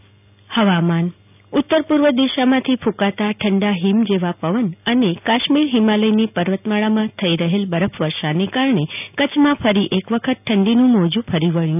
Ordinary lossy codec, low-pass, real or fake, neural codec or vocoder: none; 3.6 kHz; real; none